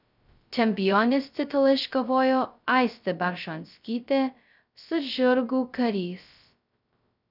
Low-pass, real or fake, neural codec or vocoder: 5.4 kHz; fake; codec, 16 kHz, 0.2 kbps, FocalCodec